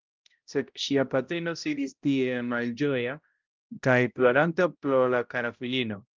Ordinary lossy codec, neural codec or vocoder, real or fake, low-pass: Opus, 32 kbps; codec, 16 kHz, 0.5 kbps, X-Codec, HuBERT features, trained on balanced general audio; fake; 7.2 kHz